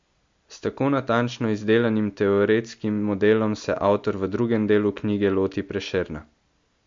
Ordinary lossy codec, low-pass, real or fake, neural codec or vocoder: MP3, 48 kbps; 7.2 kHz; real; none